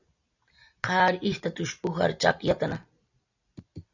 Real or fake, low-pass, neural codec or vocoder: real; 7.2 kHz; none